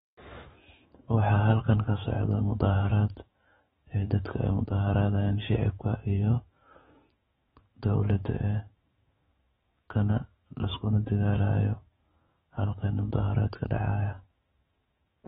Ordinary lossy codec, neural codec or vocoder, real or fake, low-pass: AAC, 16 kbps; autoencoder, 48 kHz, 128 numbers a frame, DAC-VAE, trained on Japanese speech; fake; 19.8 kHz